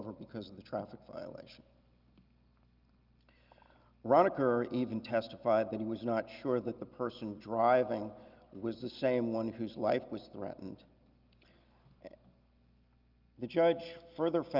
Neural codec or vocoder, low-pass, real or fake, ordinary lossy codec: none; 5.4 kHz; real; Opus, 24 kbps